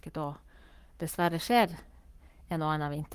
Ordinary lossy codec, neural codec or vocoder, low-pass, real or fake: Opus, 32 kbps; codec, 44.1 kHz, 7.8 kbps, Pupu-Codec; 14.4 kHz; fake